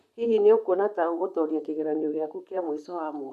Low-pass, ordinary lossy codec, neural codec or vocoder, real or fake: 14.4 kHz; none; vocoder, 44.1 kHz, 128 mel bands, Pupu-Vocoder; fake